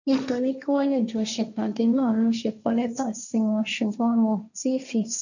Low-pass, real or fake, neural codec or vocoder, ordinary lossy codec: 7.2 kHz; fake; codec, 16 kHz, 1.1 kbps, Voila-Tokenizer; none